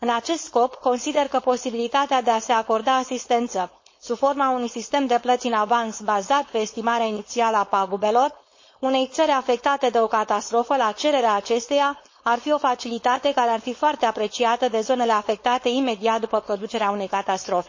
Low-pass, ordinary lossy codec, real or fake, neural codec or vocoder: 7.2 kHz; MP3, 32 kbps; fake; codec, 16 kHz, 4.8 kbps, FACodec